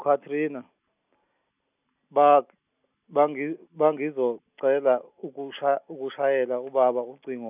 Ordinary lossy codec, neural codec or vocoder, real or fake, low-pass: none; none; real; 3.6 kHz